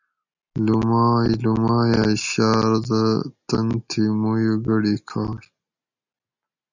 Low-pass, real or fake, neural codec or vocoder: 7.2 kHz; real; none